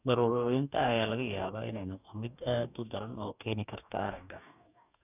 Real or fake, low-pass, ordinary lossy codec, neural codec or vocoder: fake; 3.6 kHz; none; codec, 44.1 kHz, 2.6 kbps, DAC